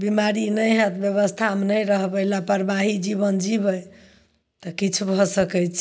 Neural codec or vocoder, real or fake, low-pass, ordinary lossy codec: none; real; none; none